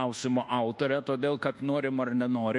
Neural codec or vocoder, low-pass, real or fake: codec, 24 kHz, 1.2 kbps, DualCodec; 10.8 kHz; fake